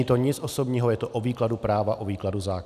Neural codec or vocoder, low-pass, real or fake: none; 14.4 kHz; real